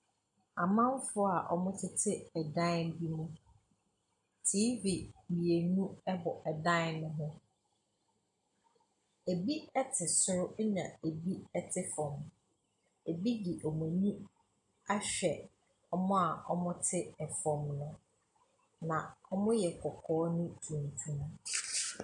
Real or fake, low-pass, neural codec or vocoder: real; 9.9 kHz; none